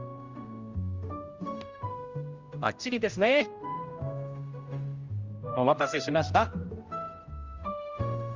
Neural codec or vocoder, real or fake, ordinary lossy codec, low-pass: codec, 16 kHz, 0.5 kbps, X-Codec, HuBERT features, trained on general audio; fake; Opus, 32 kbps; 7.2 kHz